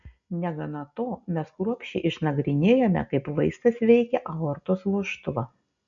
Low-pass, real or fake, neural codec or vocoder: 7.2 kHz; real; none